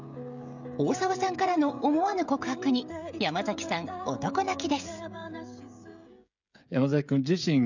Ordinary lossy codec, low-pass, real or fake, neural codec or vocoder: none; 7.2 kHz; fake; codec, 16 kHz, 16 kbps, FreqCodec, smaller model